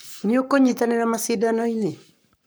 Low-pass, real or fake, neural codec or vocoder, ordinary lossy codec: none; fake; codec, 44.1 kHz, 7.8 kbps, Pupu-Codec; none